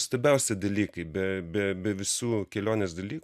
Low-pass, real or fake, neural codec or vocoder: 14.4 kHz; real; none